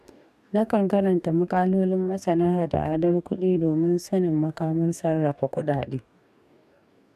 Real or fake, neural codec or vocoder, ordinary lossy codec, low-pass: fake; codec, 44.1 kHz, 2.6 kbps, DAC; none; 14.4 kHz